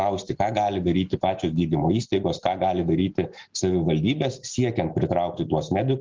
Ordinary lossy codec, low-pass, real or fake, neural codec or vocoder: Opus, 32 kbps; 7.2 kHz; real; none